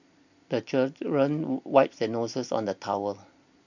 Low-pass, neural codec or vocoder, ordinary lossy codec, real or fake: 7.2 kHz; none; none; real